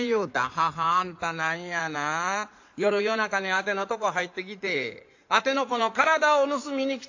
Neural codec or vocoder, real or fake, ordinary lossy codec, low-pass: codec, 16 kHz in and 24 kHz out, 2.2 kbps, FireRedTTS-2 codec; fake; AAC, 48 kbps; 7.2 kHz